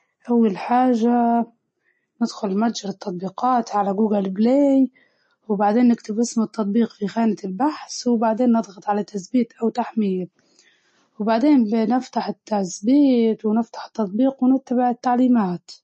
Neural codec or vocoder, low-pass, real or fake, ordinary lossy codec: none; 10.8 kHz; real; MP3, 32 kbps